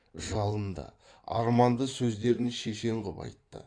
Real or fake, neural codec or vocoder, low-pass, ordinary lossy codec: fake; codec, 16 kHz in and 24 kHz out, 2.2 kbps, FireRedTTS-2 codec; 9.9 kHz; AAC, 64 kbps